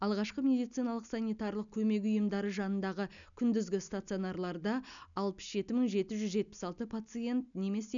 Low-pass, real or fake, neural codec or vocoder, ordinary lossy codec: 7.2 kHz; real; none; none